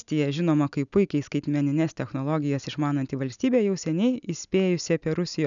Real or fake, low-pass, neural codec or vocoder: real; 7.2 kHz; none